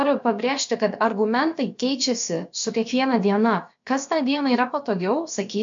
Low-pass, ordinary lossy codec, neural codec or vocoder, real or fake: 7.2 kHz; MP3, 48 kbps; codec, 16 kHz, about 1 kbps, DyCAST, with the encoder's durations; fake